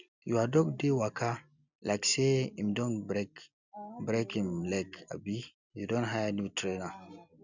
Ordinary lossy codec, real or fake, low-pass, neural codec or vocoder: none; real; 7.2 kHz; none